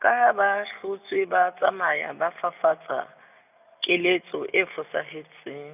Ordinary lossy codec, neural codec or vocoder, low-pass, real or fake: none; none; 3.6 kHz; real